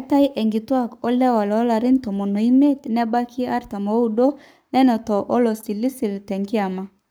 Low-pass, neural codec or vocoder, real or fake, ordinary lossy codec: none; codec, 44.1 kHz, 7.8 kbps, Pupu-Codec; fake; none